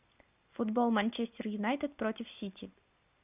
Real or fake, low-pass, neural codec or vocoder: real; 3.6 kHz; none